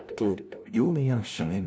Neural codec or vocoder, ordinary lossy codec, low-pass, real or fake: codec, 16 kHz, 0.5 kbps, FunCodec, trained on LibriTTS, 25 frames a second; none; none; fake